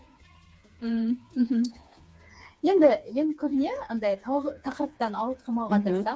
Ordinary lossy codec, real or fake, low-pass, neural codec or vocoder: none; fake; none; codec, 16 kHz, 4 kbps, FreqCodec, smaller model